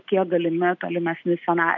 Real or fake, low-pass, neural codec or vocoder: real; 7.2 kHz; none